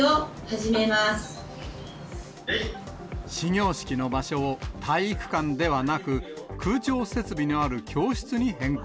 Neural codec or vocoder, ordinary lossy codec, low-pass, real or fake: none; none; none; real